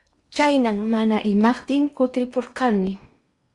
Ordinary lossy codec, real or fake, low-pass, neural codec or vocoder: Opus, 64 kbps; fake; 10.8 kHz; codec, 16 kHz in and 24 kHz out, 0.8 kbps, FocalCodec, streaming, 65536 codes